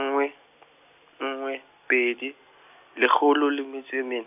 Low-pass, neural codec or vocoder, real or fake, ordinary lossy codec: 3.6 kHz; none; real; none